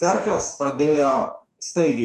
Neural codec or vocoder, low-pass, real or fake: codec, 44.1 kHz, 2.6 kbps, DAC; 14.4 kHz; fake